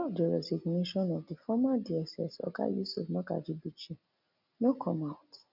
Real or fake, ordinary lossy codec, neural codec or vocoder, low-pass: real; none; none; 5.4 kHz